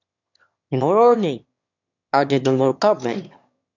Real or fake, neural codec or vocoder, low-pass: fake; autoencoder, 22.05 kHz, a latent of 192 numbers a frame, VITS, trained on one speaker; 7.2 kHz